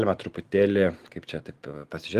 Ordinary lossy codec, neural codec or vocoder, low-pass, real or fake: Opus, 24 kbps; none; 14.4 kHz; real